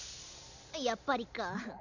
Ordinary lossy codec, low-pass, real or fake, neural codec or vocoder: none; 7.2 kHz; real; none